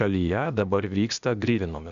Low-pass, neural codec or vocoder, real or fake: 7.2 kHz; codec, 16 kHz, 0.8 kbps, ZipCodec; fake